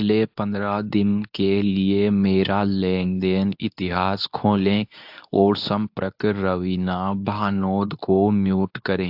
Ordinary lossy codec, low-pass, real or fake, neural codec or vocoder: AAC, 48 kbps; 5.4 kHz; fake; codec, 24 kHz, 0.9 kbps, WavTokenizer, medium speech release version 2